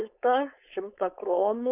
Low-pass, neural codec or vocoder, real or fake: 3.6 kHz; codec, 16 kHz, 4.8 kbps, FACodec; fake